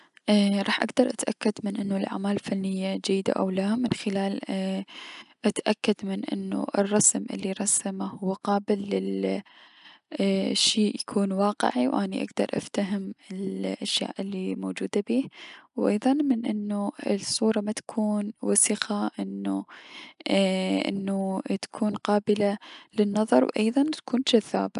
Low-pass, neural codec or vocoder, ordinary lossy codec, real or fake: 10.8 kHz; none; none; real